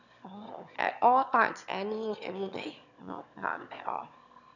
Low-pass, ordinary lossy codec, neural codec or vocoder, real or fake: 7.2 kHz; none; autoencoder, 22.05 kHz, a latent of 192 numbers a frame, VITS, trained on one speaker; fake